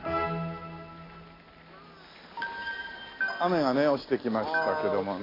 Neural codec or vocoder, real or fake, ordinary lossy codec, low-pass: none; real; AAC, 24 kbps; 5.4 kHz